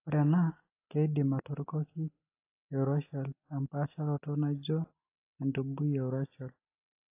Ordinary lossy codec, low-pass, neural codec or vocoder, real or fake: AAC, 24 kbps; 3.6 kHz; none; real